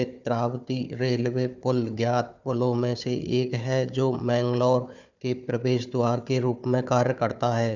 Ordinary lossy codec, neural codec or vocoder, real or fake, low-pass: none; codec, 16 kHz, 4 kbps, FunCodec, trained on Chinese and English, 50 frames a second; fake; 7.2 kHz